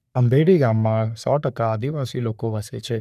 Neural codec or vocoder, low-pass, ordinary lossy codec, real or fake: codec, 44.1 kHz, 3.4 kbps, Pupu-Codec; 14.4 kHz; none; fake